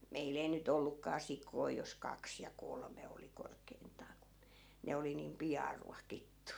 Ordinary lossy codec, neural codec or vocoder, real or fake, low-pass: none; none; real; none